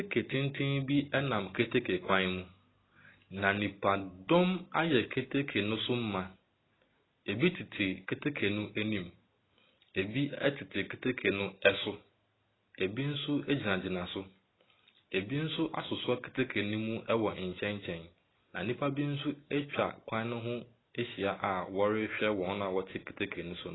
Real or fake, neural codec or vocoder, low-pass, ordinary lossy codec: real; none; 7.2 kHz; AAC, 16 kbps